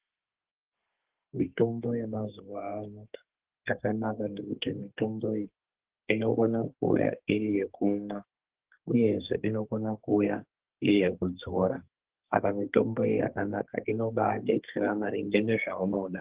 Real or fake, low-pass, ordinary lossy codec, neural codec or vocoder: fake; 3.6 kHz; Opus, 16 kbps; codec, 32 kHz, 1.9 kbps, SNAC